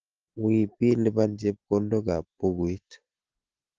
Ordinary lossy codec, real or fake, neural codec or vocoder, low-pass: Opus, 16 kbps; real; none; 7.2 kHz